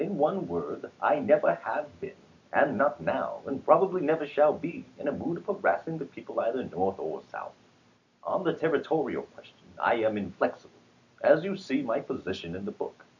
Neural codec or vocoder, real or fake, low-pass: none; real; 7.2 kHz